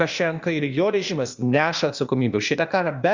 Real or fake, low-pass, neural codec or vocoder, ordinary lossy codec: fake; 7.2 kHz; codec, 16 kHz, 0.8 kbps, ZipCodec; Opus, 64 kbps